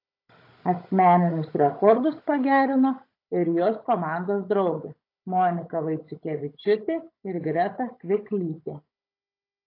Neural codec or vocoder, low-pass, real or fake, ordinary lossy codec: codec, 16 kHz, 16 kbps, FunCodec, trained on Chinese and English, 50 frames a second; 5.4 kHz; fake; AAC, 32 kbps